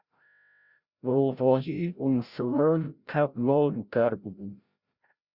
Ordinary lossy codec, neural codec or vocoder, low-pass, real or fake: Opus, 64 kbps; codec, 16 kHz, 0.5 kbps, FreqCodec, larger model; 5.4 kHz; fake